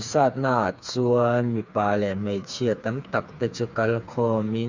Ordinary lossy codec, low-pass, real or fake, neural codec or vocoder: Opus, 64 kbps; 7.2 kHz; fake; codec, 16 kHz, 4 kbps, FreqCodec, smaller model